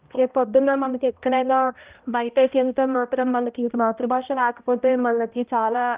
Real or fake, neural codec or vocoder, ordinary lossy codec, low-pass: fake; codec, 16 kHz, 0.5 kbps, X-Codec, HuBERT features, trained on balanced general audio; Opus, 32 kbps; 3.6 kHz